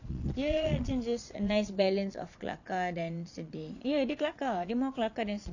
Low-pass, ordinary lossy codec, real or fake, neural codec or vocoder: 7.2 kHz; none; fake; vocoder, 22.05 kHz, 80 mel bands, Vocos